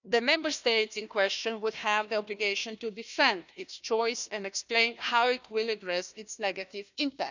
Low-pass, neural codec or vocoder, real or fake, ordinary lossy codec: 7.2 kHz; codec, 16 kHz, 1 kbps, FunCodec, trained on Chinese and English, 50 frames a second; fake; none